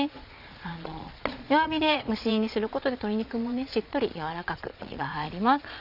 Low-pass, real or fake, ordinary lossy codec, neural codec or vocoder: 5.4 kHz; fake; none; vocoder, 22.05 kHz, 80 mel bands, Vocos